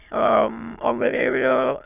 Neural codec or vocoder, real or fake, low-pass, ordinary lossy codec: autoencoder, 22.05 kHz, a latent of 192 numbers a frame, VITS, trained on many speakers; fake; 3.6 kHz; none